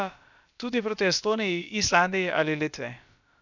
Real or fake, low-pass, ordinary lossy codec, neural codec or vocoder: fake; 7.2 kHz; none; codec, 16 kHz, about 1 kbps, DyCAST, with the encoder's durations